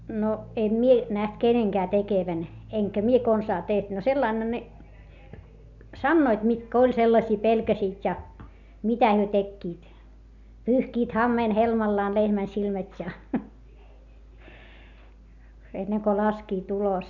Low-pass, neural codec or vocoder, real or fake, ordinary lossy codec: 7.2 kHz; none; real; none